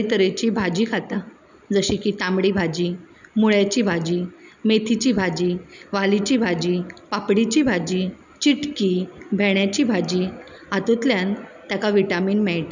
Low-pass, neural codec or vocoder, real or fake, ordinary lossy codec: 7.2 kHz; none; real; none